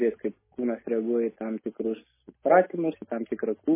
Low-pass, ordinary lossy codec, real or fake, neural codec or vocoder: 3.6 kHz; MP3, 16 kbps; real; none